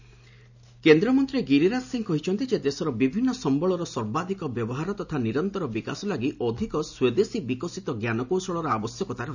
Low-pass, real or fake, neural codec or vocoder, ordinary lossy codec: 7.2 kHz; real; none; none